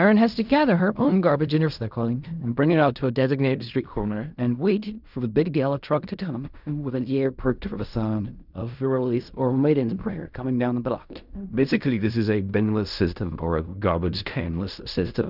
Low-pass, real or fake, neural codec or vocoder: 5.4 kHz; fake; codec, 16 kHz in and 24 kHz out, 0.4 kbps, LongCat-Audio-Codec, fine tuned four codebook decoder